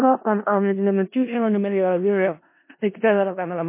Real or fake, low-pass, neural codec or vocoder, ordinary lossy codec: fake; 3.6 kHz; codec, 16 kHz in and 24 kHz out, 0.4 kbps, LongCat-Audio-Codec, four codebook decoder; MP3, 24 kbps